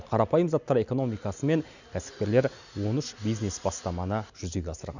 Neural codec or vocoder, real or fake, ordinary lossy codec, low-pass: none; real; none; 7.2 kHz